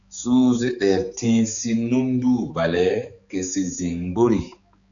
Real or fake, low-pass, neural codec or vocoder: fake; 7.2 kHz; codec, 16 kHz, 4 kbps, X-Codec, HuBERT features, trained on balanced general audio